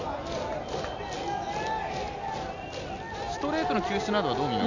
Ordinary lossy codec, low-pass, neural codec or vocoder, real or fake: none; 7.2 kHz; none; real